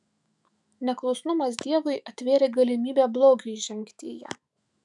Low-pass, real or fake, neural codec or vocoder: 10.8 kHz; fake; autoencoder, 48 kHz, 128 numbers a frame, DAC-VAE, trained on Japanese speech